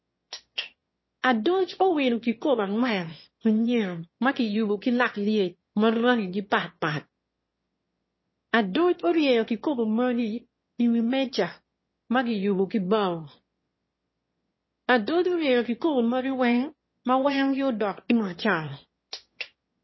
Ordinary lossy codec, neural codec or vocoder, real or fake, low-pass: MP3, 24 kbps; autoencoder, 22.05 kHz, a latent of 192 numbers a frame, VITS, trained on one speaker; fake; 7.2 kHz